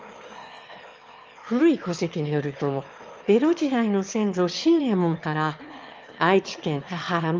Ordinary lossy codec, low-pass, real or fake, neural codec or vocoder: Opus, 24 kbps; 7.2 kHz; fake; autoencoder, 22.05 kHz, a latent of 192 numbers a frame, VITS, trained on one speaker